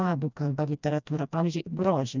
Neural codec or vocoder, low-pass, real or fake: codec, 16 kHz, 1 kbps, FreqCodec, smaller model; 7.2 kHz; fake